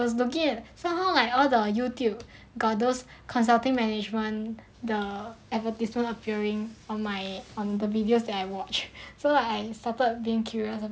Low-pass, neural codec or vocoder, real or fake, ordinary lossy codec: none; none; real; none